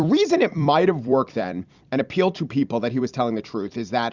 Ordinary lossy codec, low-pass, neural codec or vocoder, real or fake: Opus, 64 kbps; 7.2 kHz; vocoder, 44.1 kHz, 128 mel bands every 256 samples, BigVGAN v2; fake